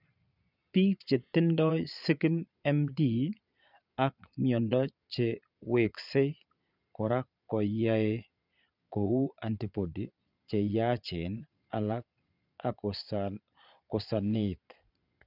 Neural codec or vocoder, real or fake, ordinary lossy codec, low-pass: vocoder, 22.05 kHz, 80 mel bands, Vocos; fake; none; 5.4 kHz